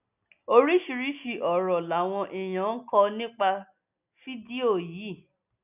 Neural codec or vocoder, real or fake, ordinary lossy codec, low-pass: none; real; none; 3.6 kHz